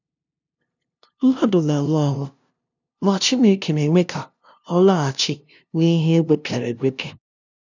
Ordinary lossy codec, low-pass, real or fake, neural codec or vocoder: none; 7.2 kHz; fake; codec, 16 kHz, 0.5 kbps, FunCodec, trained on LibriTTS, 25 frames a second